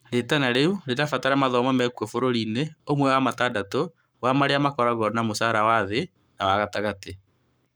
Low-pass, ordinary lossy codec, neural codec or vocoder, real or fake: none; none; codec, 44.1 kHz, 7.8 kbps, Pupu-Codec; fake